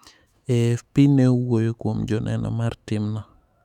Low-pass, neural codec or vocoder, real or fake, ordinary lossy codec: 19.8 kHz; autoencoder, 48 kHz, 128 numbers a frame, DAC-VAE, trained on Japanese speech; fake; none